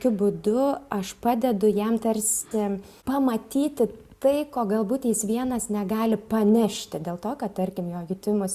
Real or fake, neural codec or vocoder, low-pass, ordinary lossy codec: real; none; 14.4 kHz; Opus, 64 kbps